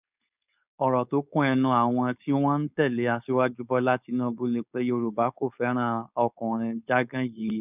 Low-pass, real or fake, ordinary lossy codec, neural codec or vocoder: 3.6 kHz; fake; none; codec, 16 kHz, 4.8 kbps, FACodec